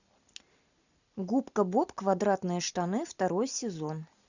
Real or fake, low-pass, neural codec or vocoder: real; 7.2 kHz; none